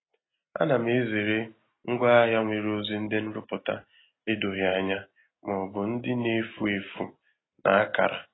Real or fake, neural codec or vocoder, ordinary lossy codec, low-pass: real; none; AAC, 16 kbps; 7.2 kHz